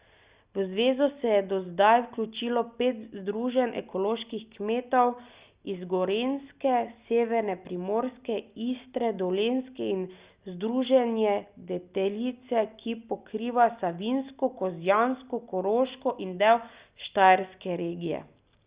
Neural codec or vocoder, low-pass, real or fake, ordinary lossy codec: none; 3.6 kHz; real; Opus, 64 kbps